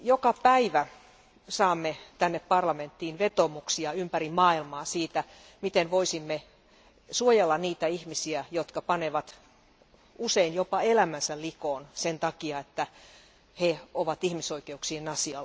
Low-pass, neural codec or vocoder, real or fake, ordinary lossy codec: none; none; real; none